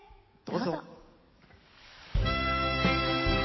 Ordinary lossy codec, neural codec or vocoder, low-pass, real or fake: MP3, 24 kbps; codec, 44.1 kHz, 7.8 kbps, DAC; 7.2 kHz; fake